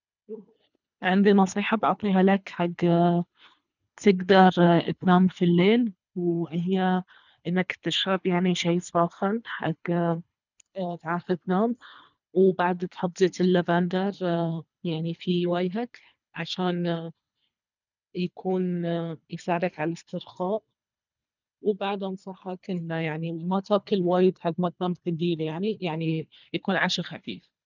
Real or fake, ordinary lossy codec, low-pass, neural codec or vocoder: fake; none; 7.2 kHz; codec, 24 kHz, 3 kbps, HILCodec